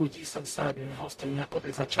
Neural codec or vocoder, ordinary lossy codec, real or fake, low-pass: codec, 44.1 kHz, 0.9 kbps, DAC; AAC, 64 kbps; fake; 14.4 kHz